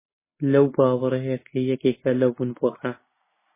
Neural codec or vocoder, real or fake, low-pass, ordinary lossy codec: none; real; 3.6 kHz; MP3, 16 kbps